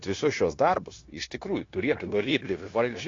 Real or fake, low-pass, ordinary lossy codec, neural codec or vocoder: fake; 7.2 kHz; AAC, 32 kbps; codec, 16 kHz, 0.9 kbps, LongCat-Audio-Codec